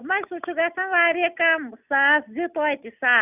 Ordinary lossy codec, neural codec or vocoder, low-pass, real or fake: none; none; 3.6 kHz; real